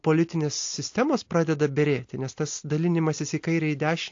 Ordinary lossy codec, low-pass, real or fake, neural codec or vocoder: AAC, 48 kbps; 7.2 kHz; real; none